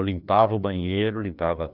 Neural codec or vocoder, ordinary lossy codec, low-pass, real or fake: codec, 16 kHz, 2 kbps, FreqCodec, larger model; none; 5.4 kHz; fake